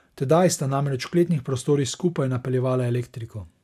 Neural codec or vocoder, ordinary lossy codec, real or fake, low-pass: none; AAC, 96 kbps; real; 14.4 kHz